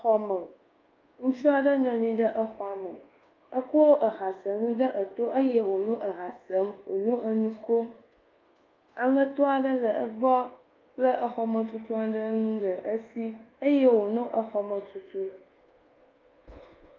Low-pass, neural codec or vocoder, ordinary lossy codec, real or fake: 7.2 kHz; codec, 24 kHz, 1.2 kbps, DualCodec; Opus, 32 kbps; fake